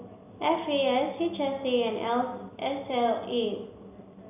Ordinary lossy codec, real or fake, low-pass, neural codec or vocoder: none; real; 3.6 kHz; none